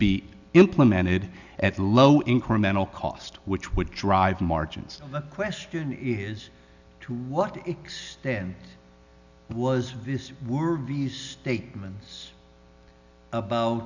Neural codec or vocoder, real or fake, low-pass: none; real; 7.2 kHz